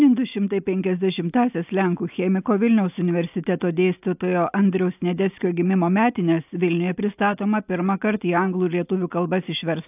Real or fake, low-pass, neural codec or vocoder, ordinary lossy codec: real; 3.6 kHz; none; AAC, 32 kbps